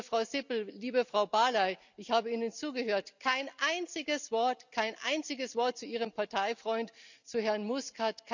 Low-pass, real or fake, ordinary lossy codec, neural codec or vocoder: 7.2 kHz; real; none; none